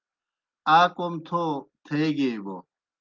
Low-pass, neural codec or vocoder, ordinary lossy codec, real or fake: 7.2 kHz; none; Opus, 32 kbps; real